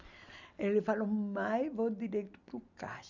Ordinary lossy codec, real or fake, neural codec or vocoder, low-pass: none; real; none; 7.2 kHz